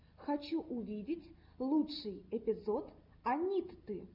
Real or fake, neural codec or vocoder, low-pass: real; none; 5.4 kHz